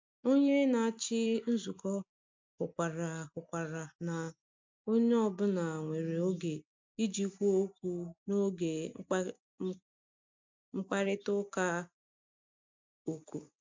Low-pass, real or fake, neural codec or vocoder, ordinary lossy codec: 7.2 kHz; real; none; MP3, 64 kbps